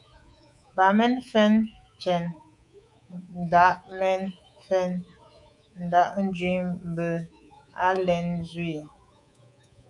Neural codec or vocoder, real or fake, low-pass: codec, 24 kHz, 3.1 kbps, DualCodec; fake; 10.8 kHz